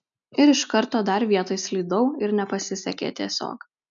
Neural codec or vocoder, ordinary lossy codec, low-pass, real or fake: none; MP3, 96 kbps; 7.2 kHz; real